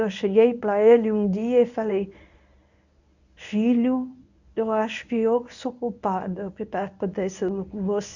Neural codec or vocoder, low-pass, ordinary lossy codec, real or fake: codec, 24 kHz, 0.9 kbps, WavTokenizer, medium speech release version 1; 7.2 kHz; none; fake